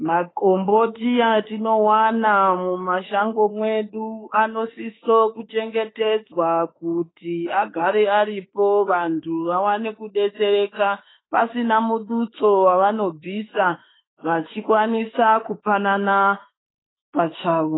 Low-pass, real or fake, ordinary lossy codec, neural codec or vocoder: 7.2 kHz; fake; AAC, 16 kbps; autoencoder, 48 kHz, 32 numbers a frame, DAC-VAE, trained on Japanese speech